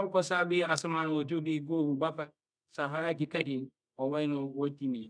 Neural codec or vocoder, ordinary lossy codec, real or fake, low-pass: codec, 24 kHz, 0.9 kbps, WavTokenizer, medium music audio release; none; fake; 9.9 kHz